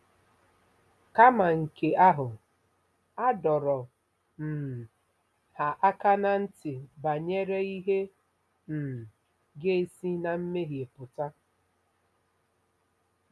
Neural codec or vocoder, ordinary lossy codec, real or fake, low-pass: none; none; real; none